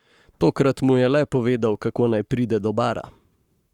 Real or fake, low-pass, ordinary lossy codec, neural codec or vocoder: fake; 19.8 kHz; Opus, 64 kbps; codec, 44.1 kHz, 7.8 kbps, Pupu-Codec